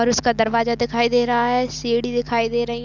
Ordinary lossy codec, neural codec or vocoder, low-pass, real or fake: none; none; 7.2 kHz; real